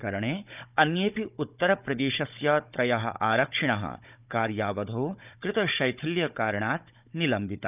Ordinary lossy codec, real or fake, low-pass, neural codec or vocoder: none; fake; 3.6 kHz; codec, 16 kHz, 16 kbps, FunCodec, trained on Chinese and English, 50 frames a second